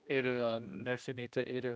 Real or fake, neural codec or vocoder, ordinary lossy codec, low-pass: fake; codec, 16 kHz, 1 kbps, X-Codec, HuBERT features, trained on general audio; none; none